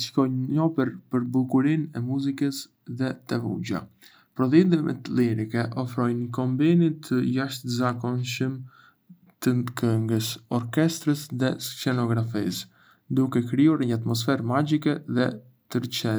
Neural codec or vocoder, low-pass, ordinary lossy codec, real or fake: none; none; none; real